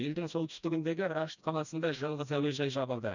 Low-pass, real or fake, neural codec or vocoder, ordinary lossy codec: 7.2 kHz; fake; codec, 16 kHz, 1 kbps, FreqCodec, smaller model; none